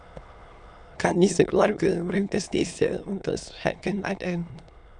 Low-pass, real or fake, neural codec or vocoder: 9.9 kHz; fake; autoencoder, 22.05 kHz, a latent of 192 numbers a frame, VITS, trained on many speakers